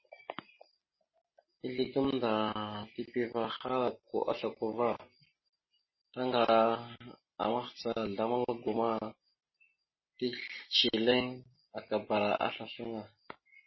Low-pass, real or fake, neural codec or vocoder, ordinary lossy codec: 7.2 kHz; real; none; MP3, 24 kbps